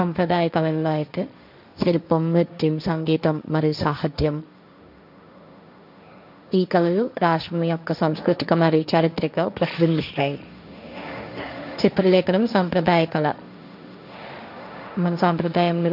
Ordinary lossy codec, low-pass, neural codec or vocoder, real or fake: none; 5.4 kHz; codec, 16 kHz, 1.1 kbps, Voila-Tokenizer; fake